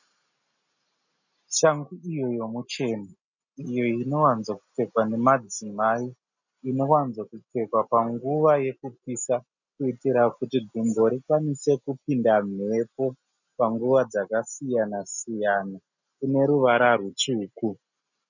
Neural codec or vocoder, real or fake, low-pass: none; real; 7.2 kHz